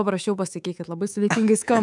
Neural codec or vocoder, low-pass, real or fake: codec, 24 kHz, 3.1 kbps, DualCodec; 10.8 kHz; fake